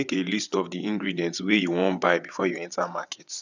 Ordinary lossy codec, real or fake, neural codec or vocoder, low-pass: none; fake; vocoder, 22.05 kHz, 80 mel bands, WaveNeXt; 7.2 kHz